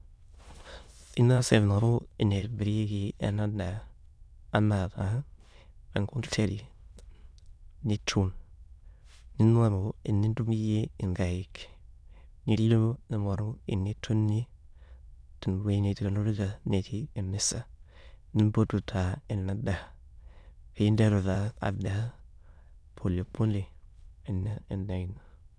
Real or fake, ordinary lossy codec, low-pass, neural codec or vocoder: fake; none; none; autoencoder, 22.05 kHz, a latent of 192 numbers a frame, VITS, trained on many speakers